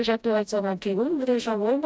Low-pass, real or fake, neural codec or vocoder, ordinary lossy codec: none; fake; codec, 16 kHz, 0.5 kbps, FreqCodec, smaller model; none